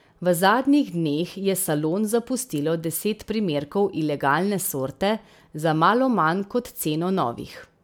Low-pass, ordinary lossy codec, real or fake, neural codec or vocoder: none; none; real; none